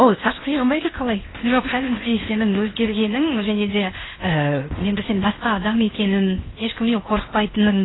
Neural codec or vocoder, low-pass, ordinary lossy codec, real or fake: codec, 16 kHz in and 24 kHz out, 0.8 kbps, FocalCodec, streaming, 65536 codes; 7.2 kHz; AAC, 16 kbps; fake